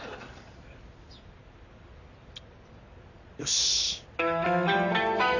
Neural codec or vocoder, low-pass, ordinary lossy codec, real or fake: none; 7.2 kHz; none; real